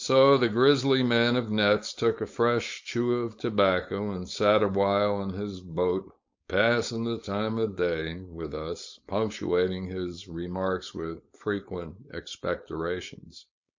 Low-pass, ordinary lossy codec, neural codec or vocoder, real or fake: 7.2 kHz; MP3, 48 kbps; codec, 16 kHz, 4.8 kbps, FACodec; fake